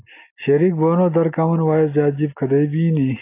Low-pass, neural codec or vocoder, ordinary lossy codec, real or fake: 3.6 kHz; none; AAC, 24 kbps; real